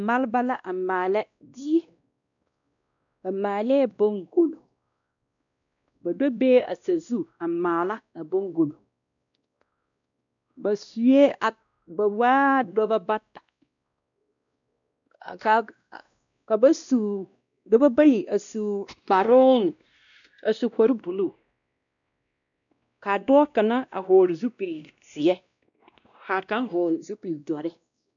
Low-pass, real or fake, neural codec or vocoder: 7.2 kHz; fake; codec, 16 kHz, 1 kbps, X-Codec, WavLM features, trained on Multilingual LibriSpeech